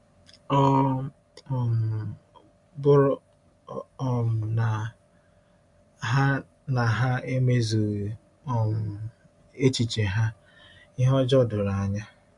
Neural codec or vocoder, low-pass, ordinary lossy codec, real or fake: none; 10.8 kHz; MP3, 64 kbps; real